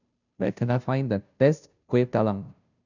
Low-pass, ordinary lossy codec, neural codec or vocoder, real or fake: 7.2 kHz; none; codec, 16 kHz, 0.5 kbps, FunCodec, trained on Chinese and English, 25 frames a second; fake